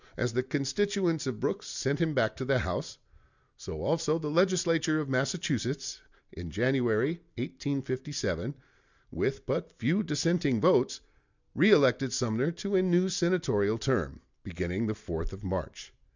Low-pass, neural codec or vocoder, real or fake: 7.2 kHz; none; real